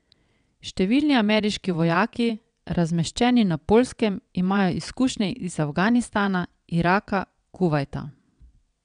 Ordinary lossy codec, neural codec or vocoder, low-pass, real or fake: none; vocoder, 22.05 kHz, 80 mel bands, WaveNeXt; 9.9 kHz; fake